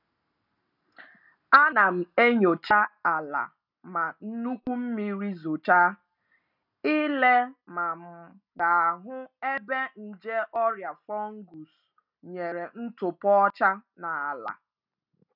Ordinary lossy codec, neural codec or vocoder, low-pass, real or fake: none; none; 5.4 kHz; real